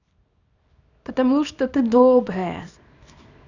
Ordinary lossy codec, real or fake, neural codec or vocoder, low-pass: none; fake; codec, 24 kHz, 0.9 kbps, WavTokenizer, small release; 7.2 kHz